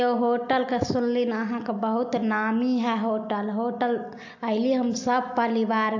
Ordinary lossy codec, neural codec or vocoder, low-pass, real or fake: AAC, 48 kbps; none; 7.2 kHz; real